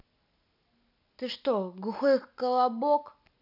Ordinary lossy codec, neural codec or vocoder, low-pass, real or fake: none; none; 5.4 kHz; real